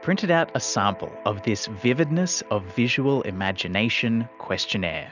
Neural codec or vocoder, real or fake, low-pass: none; real; 7.2 kHz